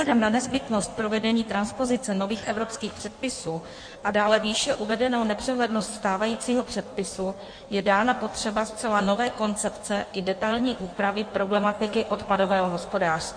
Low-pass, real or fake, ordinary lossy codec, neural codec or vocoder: 9.9 kHz; fake; MP3, 48 kbps; codec, 16 kHz in and 24 kHz out, 1.1 kbps, FireRedTTS-2 codec